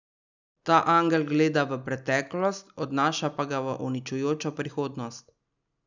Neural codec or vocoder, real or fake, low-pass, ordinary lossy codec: none; real; 7.2 kHz; none